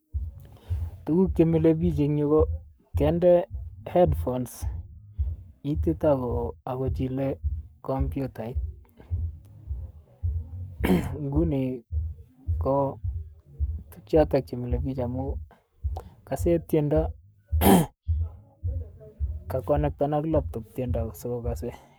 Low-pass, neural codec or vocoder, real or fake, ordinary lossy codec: none; codec, 44.1 kHz, 7.8 kbps, Pupu-Codec; fake; none